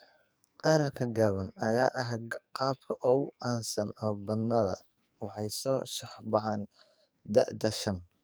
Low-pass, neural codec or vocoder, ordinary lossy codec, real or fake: none; codec, 44.1 kHz, 2.6 kbps, SNAC; none; fake